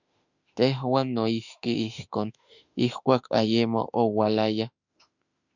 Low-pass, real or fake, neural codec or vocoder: 7.2 kHz; fake; autoencoder, 48 kHz, 32 numbers a frame, DAC-VAE, trained on Japanese speech